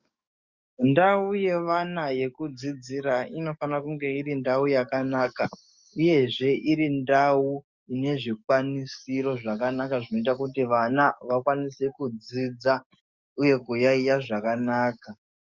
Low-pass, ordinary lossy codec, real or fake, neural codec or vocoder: 7.2 kHz; Opus, 64 kbps; fake; codec, 44.1 kHz, 7.8 kbps, DAC